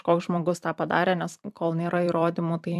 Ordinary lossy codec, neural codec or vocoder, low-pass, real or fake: AAC, 96 kbps; vocoder, 44.1 kHz, 128 mel bands every 256 samples, BigVGAN v2; 14.4 kHz; fake